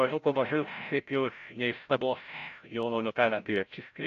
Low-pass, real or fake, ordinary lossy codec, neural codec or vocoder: 7.2 kHz; fake; AAC, 48 kbps; codec, 16 kHz, 0.5 kbps, FreqCodec, larger model